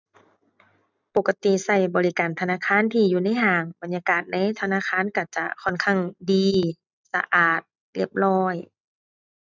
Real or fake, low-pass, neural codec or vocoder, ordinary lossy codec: real; 7.2 kHz; none; none